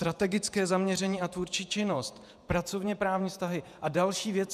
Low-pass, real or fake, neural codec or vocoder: 14.4 kHz; fake; vocoder, 48 kHz, 128 mel bands, Vocos